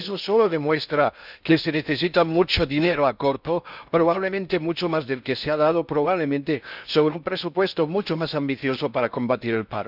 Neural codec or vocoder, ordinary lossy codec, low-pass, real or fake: codec, 16 kHz in and 24 kHz out, 0.6 kbps, FocalCodec, streaming, 4096 codes; none; 5.4 kHz; fake